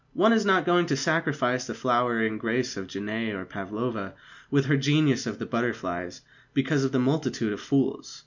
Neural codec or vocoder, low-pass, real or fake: none; 7.2 kHz; real